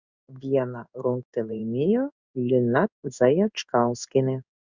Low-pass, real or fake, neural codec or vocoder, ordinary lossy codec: 7.2 kHz; fake; codec, 16 kHz in and 24 kHz out, 1 kbps, XY-Tokenizer; Opus, 64 kbps